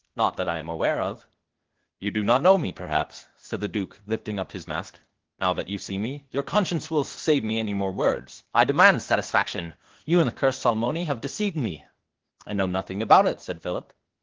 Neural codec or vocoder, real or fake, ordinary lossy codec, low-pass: codec, 16 kHz, 0.8 kbps, ZipCodec; fake; Opus, 16 kbps; 7.2 kHz